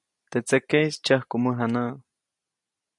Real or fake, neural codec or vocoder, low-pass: real; none; 10.8 kHz